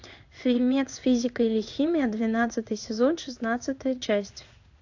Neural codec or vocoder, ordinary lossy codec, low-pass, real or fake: codec, 16 kHz in and 24 kHz out, 1 kbps, XY-Tokenizer; AAC, 48 kbps; 7.2 kHz; fake